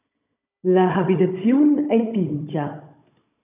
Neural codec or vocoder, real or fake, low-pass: codec, 16 kHz, 16 kbps, FunCodec, trained on Chinese and English, 50 frames a second; fake; 3.6 kHz